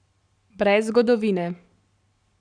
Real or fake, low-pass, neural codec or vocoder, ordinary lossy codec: fake; 9.9 kHz; codec, 44.1 kHz, 7.8 kbps, Pupu-Codec; none